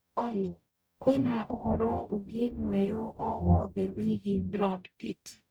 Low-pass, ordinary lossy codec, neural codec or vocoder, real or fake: none; none; codec, 44.1 kHz, 0.9 kbps, DAC; fake